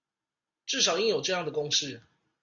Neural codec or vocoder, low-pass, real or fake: none; 7.2 kHz; real